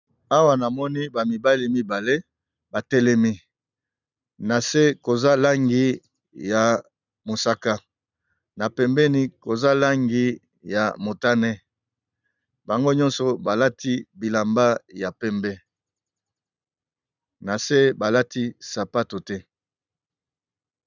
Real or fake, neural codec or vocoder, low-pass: real; none; 7.2 kHz